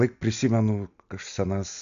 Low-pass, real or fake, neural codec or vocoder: 7.2 kHz; real; none